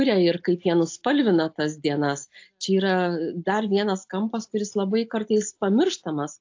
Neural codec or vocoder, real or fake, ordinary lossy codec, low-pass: none; real; AAC, 48 kbps; 7.2 kHz